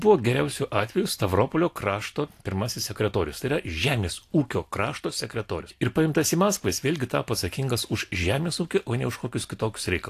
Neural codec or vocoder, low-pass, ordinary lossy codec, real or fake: none; 14.4 kHz; AAC, 64 kbps; real